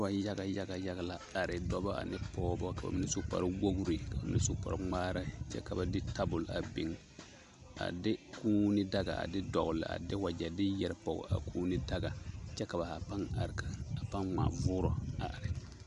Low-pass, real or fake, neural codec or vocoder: 10.8 kHz; real; none